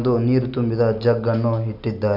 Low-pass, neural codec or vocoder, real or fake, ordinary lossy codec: 5.4 kHz; none; real; AAC, 48 kbps